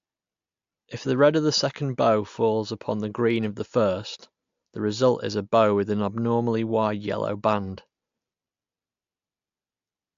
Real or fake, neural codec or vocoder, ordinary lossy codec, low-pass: real; none; AAC, 64 kbps; 7.2 kHz